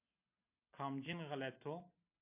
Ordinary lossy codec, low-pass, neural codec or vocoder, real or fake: AAC, 32 kbps; 3.6 kHz; none; real